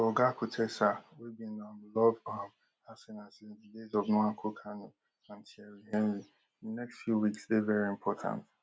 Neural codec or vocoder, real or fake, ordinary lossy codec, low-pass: none; real; none; none